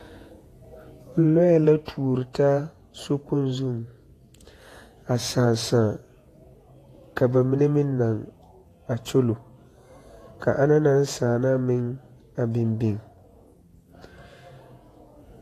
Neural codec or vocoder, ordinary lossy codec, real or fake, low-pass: vocoder, 48 kHz, 128 mel bands, Vocos; AAC, 48 kbps; fake; 14.4 kHz